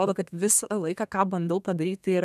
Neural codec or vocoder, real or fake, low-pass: codec, 32 kHz, 1.9 kbps, SNAC; fake; 14.4 kHz